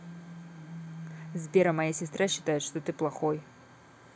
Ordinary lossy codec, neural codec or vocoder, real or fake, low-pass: none; none; real; none